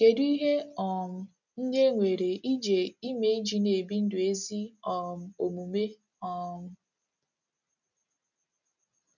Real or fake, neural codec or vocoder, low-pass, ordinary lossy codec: real; none; 7.2 kHz; none